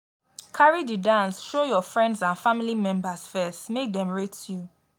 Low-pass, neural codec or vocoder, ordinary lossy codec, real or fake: none; none; none; real